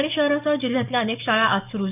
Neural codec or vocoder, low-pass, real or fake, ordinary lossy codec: codec, 44.1 kHz, 7.8 kbps, DAC; 3.6 kHz; fake; none